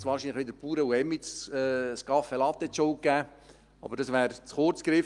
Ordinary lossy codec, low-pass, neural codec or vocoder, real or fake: Opus, 32 kbps; 10.8 kHz; none; real